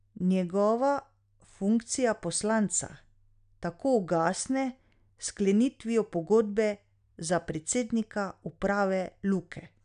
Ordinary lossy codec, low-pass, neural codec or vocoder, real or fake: none; 9.9 kHz; none; real